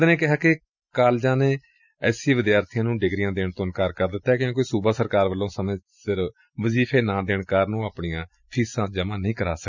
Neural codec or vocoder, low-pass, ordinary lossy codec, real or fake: none; 7.2 kHz; none; real